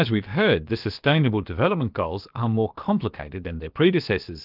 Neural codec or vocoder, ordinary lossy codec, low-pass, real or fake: codec, 16 kHz, about 1 kbps, DyCAST, with the encoder's durations; Opus, 24 kbps; 5.4 kHz; fake